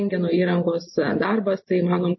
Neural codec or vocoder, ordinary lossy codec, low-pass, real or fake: none; MP3, 24 kbps; 7.2 kHz; real